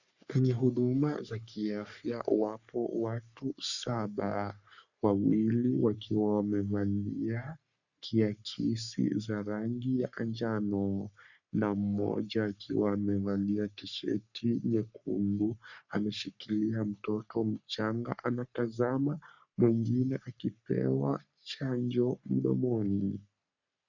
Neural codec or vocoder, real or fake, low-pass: codec, 44.1 kHz, 3.4 kbps, Pupu-Codec; fake; 7.2 kHz